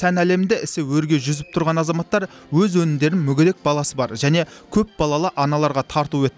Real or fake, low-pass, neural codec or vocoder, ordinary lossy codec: real; none; none; none